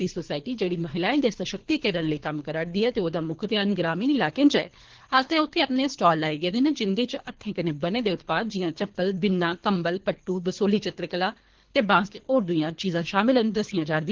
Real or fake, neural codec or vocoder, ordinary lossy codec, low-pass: fake; codec, 24 kHz, 3 kbps, HILCodec; Opus, 16 kbps; 7.2 kHz